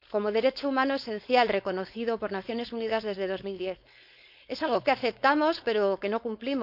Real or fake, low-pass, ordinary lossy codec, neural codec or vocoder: fake; 5.4 kHz; none; codec, 16 kHz, 4.8 kbps, FACodec